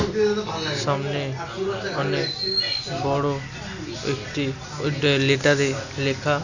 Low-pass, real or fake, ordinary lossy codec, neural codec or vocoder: 7.2 kHz; real; none; none